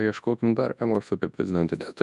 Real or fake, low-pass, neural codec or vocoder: fake; 10.8 kHz; codec, 24 kHz, 0.9 kbps, WavTokenizer, large speech release